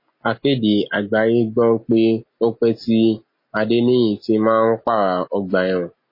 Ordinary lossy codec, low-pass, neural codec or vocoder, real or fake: MP3, 24 kbps; 5.4 kHz; none; real